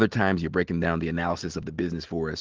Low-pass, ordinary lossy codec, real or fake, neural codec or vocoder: 7.2 kHz; Opus, 16 kbps; real; none